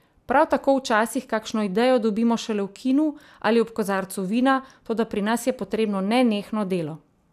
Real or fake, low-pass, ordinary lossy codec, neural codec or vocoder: real; 14.4 kHz; none; none